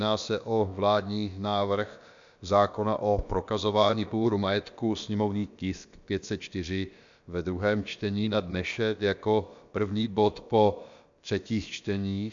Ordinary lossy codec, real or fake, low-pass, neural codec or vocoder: MP3, 64 kbps; fake; 7.2 kHz; codec, 16 kHz, about 1 kbps, DyCAST, with the encoder's durations